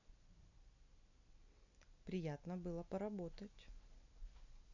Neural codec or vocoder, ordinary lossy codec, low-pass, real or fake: none; none; 7.2 kHz; real